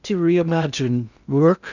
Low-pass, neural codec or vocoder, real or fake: 7.2 kHz; codec, 16 kHz in and 24 kHz out, 0.6 kbps, FocalCodec, streaming, 2048 codes; fake